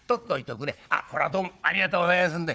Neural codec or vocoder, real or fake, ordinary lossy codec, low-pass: codec, 16 kHz, 4 kbps, FunCodec, trained on Chinese and English, 50 frames a second; fake; none; none